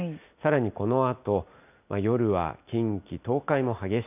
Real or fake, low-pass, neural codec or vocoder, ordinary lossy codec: real; 3.6 kHz; none; none